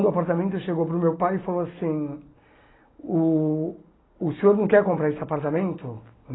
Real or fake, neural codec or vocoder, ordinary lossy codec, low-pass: real; none; AAC, 16 kbps; 7.2 kHz